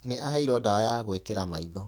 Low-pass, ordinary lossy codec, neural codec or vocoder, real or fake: none; none; codec, 44.1 kHz, 2.6 kbps, SNAC; fake